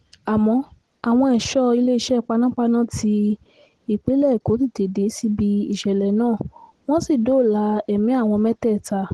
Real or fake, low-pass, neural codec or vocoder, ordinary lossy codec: real; 10.8 kHz; none; Opus, 16 kbps